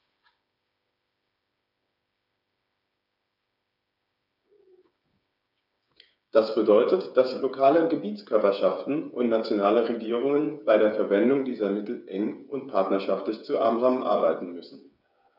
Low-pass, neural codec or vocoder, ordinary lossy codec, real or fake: 5.4 kHz; codec, 16 kHz, 8 kbps, FreqCodec, smaller model; none; fake